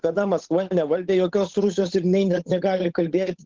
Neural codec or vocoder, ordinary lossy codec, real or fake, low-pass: codec, 16 kHz, 8 kbps, FunCodec, trained on Chinese and English, 25 frames a second; Opus, 16 kbps; fake; 7.2 kHz